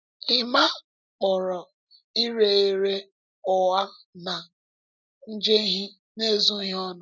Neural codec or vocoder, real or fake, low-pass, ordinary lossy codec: none; real; 7.2 kHz; none